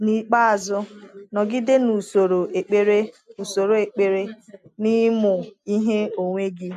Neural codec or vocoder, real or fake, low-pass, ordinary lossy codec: none; real; 14.4 kHz; AAC, 96 kbps